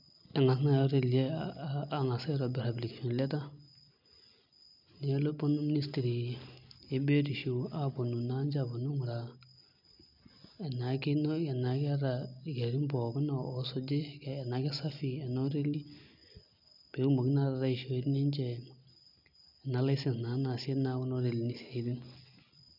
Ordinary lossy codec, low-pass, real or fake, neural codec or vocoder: none; 5.4 kHz; real; none